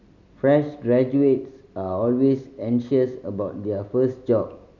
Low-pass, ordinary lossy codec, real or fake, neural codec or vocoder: 7.2 kHz; none; real; none